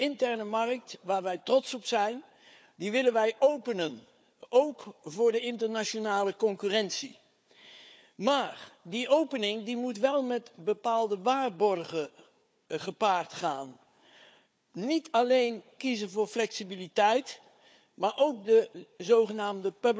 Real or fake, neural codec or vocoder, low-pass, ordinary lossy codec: fake; codec, 16 kHz, 4 kbps, FunCodec, trained on Chinese and English, 50 frames a second; none; none